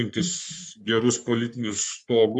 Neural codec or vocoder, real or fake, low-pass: codec, 44.1 kHz, 3.4 kbps, Pupu-Codec; fake; 10.8 kHz